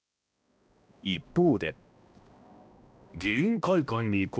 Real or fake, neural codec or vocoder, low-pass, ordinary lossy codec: fake; codec, 16 kHz, 1 kbps, X-Codec, HuBERT features, trained on balanced general audio; none; none